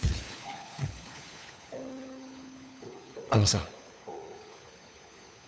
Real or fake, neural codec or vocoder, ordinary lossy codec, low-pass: fake; codec, 16 kHz, 4 kbps, FunCodec, trained on LibriTTS, 50 frames a second; none; none